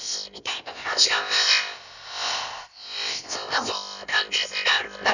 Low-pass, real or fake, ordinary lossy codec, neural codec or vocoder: 7.2 kHz; fake; none; codec, 16 kHz, about 1 kbps, DyCAST, with the encoder's durations